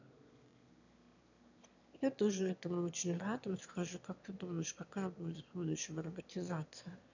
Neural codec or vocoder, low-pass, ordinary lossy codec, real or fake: autoencoder, 22.05 kHz, a latent of 192 numbers a frame, VITS, trained on one speaker; 7.2 kHz; none; fake